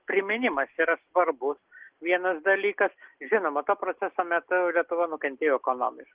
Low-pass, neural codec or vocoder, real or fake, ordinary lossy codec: 3.6 kHz; none; real; Opus, 16 kbps